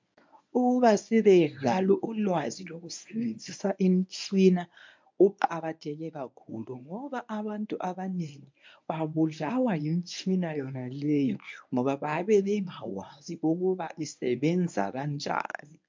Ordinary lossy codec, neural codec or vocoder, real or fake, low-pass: MP3, 64 kbps; codec, 24 kHz, 0.9 kbps, WavTokenizer, medium speech release version 1; fake; 7.2 kHz